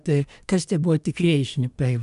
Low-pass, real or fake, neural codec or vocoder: 10.8 kHz; fake; codec, 24 kHz, 1 kbps, SNAC